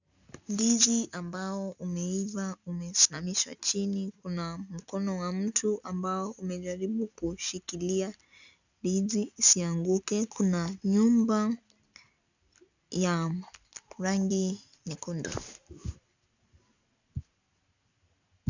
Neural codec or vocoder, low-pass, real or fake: none; 7.2 kHz; real